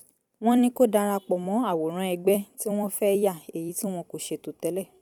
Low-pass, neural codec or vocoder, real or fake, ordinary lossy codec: 19.8 kHz; vocoder, 44.1 kHz, 128 mel bands every 256 samples, BigVGAN v2; fake; none